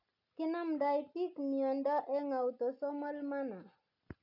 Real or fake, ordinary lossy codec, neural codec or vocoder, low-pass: real; none; none; 5.4 kHz